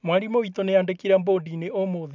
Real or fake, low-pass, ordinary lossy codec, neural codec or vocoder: real; 7.2 kHz; none; none